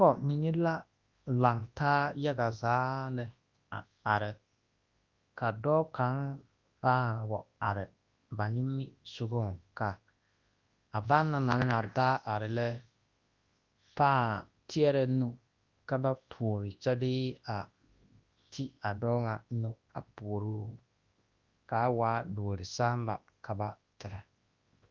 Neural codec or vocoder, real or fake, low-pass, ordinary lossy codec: codec, 24 kHz, 0.9 kbps, WavTokenizer, large speech release; fake; 7.2 kHz; Opus, 32 kbps